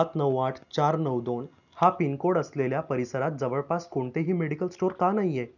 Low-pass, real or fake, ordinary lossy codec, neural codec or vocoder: 7.2 kHz; real; none; none